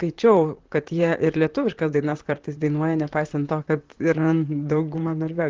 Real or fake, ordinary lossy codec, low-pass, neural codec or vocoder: fake; Opus, 16 kbps; 7.2 kHz; vocoder, 44.1 kHz, 128 mel bands, Pupu-Vocoder